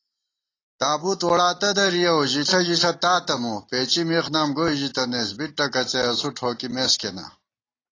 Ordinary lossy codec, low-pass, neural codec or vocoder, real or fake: AAC, 32 kbps; 7.2 kHz; none; real